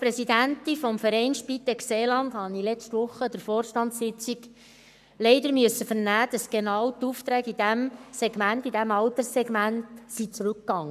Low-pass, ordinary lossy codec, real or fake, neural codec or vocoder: 14.4 kHz; none; fake; codec, 44.1 kHz, 7.8 kbps, Pupu-Codec